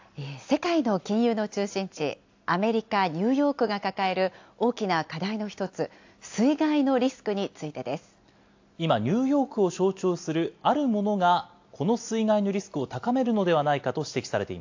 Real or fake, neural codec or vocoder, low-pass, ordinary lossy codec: real; none; 7.2 kHz; AAC, 48 kbps